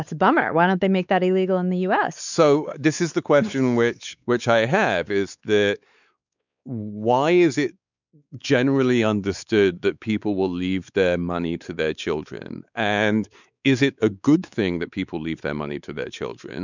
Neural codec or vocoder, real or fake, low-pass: codec, 16 kHz, 4 kbps, X-Codec, WavLM features, trained on Multilingual LibriSpeech; fake; 7.2 kHz